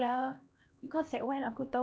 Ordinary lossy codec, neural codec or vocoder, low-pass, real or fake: none; codec, 16 kHz, 1 kbps, X-Codec, HuBERT features, trained on LibriSpeech; none; fake